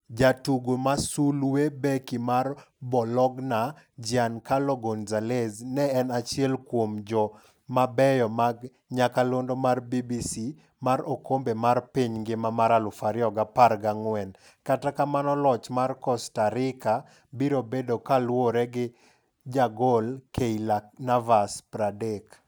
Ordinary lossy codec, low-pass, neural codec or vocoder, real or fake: none; none; none; real